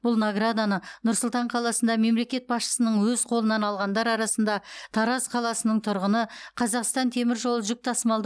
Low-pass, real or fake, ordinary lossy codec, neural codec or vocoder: none; real; none; none